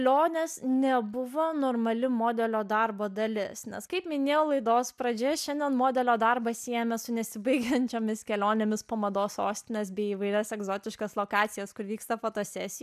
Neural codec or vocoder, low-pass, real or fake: none; 14.4 kHz; real